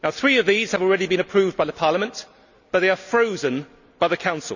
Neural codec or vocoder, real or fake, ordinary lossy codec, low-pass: none; real; none; 7.2 kHz